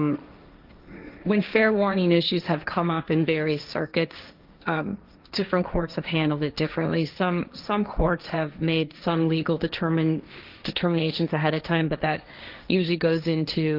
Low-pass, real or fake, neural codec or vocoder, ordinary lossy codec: 5.4 kHz; fake; codec, 16 kHz, 1.1 kbps, Voila-Tokenizer; Opus, 24 kbps